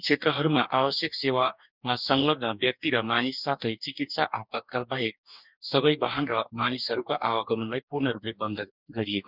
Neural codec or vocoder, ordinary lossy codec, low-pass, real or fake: codec, 44.1 kHz, 2.6 kbps, DAC; none; 5.4 kHz; fake